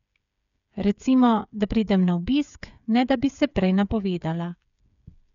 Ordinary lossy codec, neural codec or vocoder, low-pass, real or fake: none; codec, 16 kHz, 8 kbps, FreqCodec, smaller model; 7.2 kHz; fake